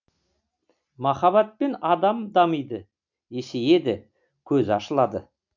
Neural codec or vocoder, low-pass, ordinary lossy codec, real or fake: none; 7.2 kHz; none; real